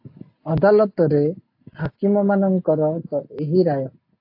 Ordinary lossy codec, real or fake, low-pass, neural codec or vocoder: AAC, 32 kbps; real; 5.4 kHz; none